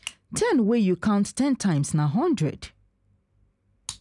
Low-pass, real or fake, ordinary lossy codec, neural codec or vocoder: 10.8 kHz; real; none; none